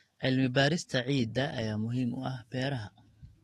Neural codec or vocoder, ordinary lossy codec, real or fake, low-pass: autoencoder, 48 kHz, 128 numbers a frame, DAC-VAE, trained on Japanese speech; AAC, 32 kbps; fake; 19.8 kHz